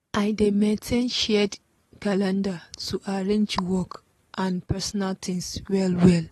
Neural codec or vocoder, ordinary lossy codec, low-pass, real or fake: none; AAC, 32 kbps; 19.8 kHz; real